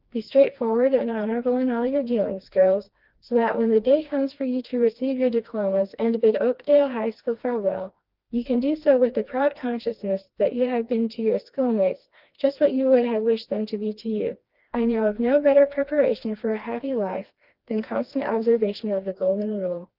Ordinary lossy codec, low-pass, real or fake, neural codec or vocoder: Opus, 32 kbps; 5.4 kHz; fake; codec, 16 kHz, 2 kbps, FreqCodec, smaller model